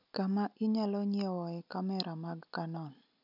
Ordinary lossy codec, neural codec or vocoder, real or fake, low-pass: none; none; real; 5.4 kHz